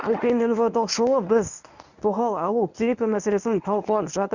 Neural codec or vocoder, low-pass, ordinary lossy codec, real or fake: codec, 24 kHz, 0.9 kbps, WavTokenizer, medium speech release version 1; 7.2 kHz; none; fake